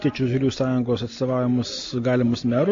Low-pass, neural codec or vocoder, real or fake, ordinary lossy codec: 7.2 kHz; none; real; MP3, 48 kbps